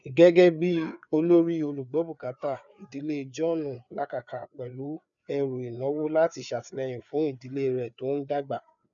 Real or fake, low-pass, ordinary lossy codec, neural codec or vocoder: fake; 7.2 kHz; none; codec, 16 kHz, 4 kbps, FreqCodec, larger model